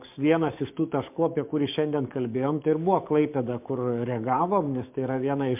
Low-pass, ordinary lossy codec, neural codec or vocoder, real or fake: 3.6 kHz; MP3, 32 kbps; codec, 44.1 kHz, 7.8 kbps, DAC; fake